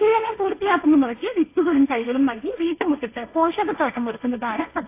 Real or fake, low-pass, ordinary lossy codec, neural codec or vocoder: fake; 3.6 kHz; AAC, 24 kbps; codec, 16 kHz, 1.1 kbps, Voila-Tokenizer